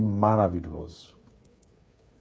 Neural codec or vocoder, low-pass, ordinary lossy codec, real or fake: codec, 16 kHz, 8 kbps, FreqCodec, smaller model; none; none; fake